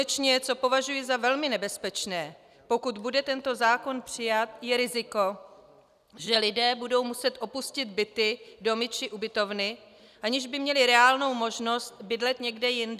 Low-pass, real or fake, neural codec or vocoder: 14.4 kHz; real; none